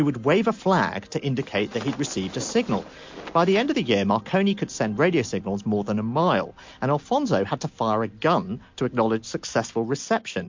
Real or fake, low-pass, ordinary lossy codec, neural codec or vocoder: real; 7.2 kHz; MP3, 48 kbps; none